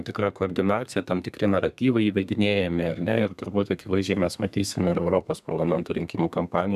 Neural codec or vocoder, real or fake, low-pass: codec, 32 kHz, 1.9 kbps, SNAC; fake; 14.4 kHz